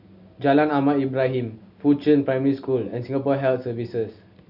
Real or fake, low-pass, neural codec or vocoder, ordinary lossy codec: real; 5.4 kHz; none; none